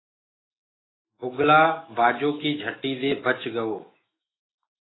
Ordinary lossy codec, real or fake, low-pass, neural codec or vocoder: AAC, 16 kbps; fake; 7.2 kHz; autoencoder, 48 kHz, 128 numbers a frame, DAC-VAE, trained on Japanese speech